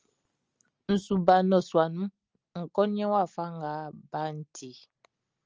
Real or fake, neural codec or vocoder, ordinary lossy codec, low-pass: real; none; Opus, 24 kbps; 7.2 kHz